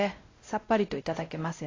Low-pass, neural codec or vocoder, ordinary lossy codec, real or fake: 7.2 kHz; codec, 16 kHz, 0.5 kbps, X-Codec, WavLM features, trained on Multilingual LibriSpeech; AAC, 32 kbps; fake